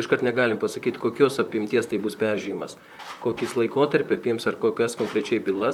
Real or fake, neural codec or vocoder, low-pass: fake; vocoder, 44.1 kHz, 128 mel bands, Pupu-Vocoder; 19.8 kHz